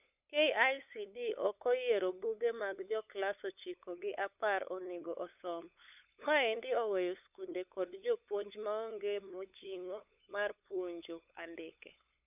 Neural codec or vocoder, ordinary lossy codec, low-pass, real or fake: codec, 16 kHz, 8 kbps, FunCodec, trained on LibriTTS, 25 frames a second; none; 3.6 kHz; fake